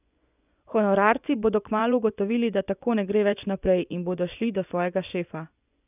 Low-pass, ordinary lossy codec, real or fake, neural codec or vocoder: 3.6 kHz; none; fake; vocoder, 24 kHz, 100 mel bands, Vocos